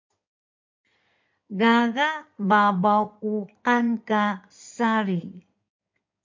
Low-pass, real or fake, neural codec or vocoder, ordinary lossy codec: 7.2 kHz; fake; codec, 16 kHz in and 24 kHz out, 2.2 kbps, FireRedTTS-2 codec; MP3, 64 kbps